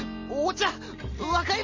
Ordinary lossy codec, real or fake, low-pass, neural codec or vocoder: MP3, 32 kbps; real; 7.2 kHz; none